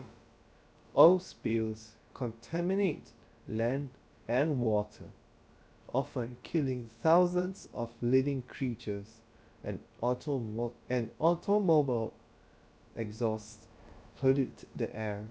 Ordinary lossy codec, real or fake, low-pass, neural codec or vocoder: none; fake; none; codec, 16 kHz, about 1 kbps, DyCAST, with the encoder's durations